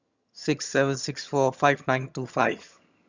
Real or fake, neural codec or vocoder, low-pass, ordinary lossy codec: fake; vocoder, 22.05 kHz, 80 mel bands, HiFi-GAN; 7.2 kHz; Opus, 64 kbps